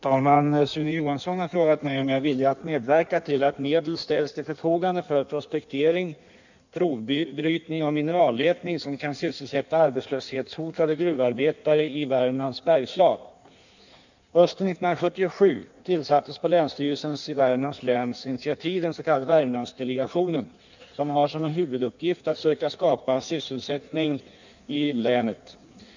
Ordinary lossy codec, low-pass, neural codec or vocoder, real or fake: none; 7.2 kHz; codec, 16 kHz in and 24 kHz out, 1.1 kbps, FireRedTTS-2 codec; fake